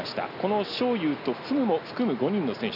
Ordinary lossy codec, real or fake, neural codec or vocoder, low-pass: none; real; none; 5.4 kHz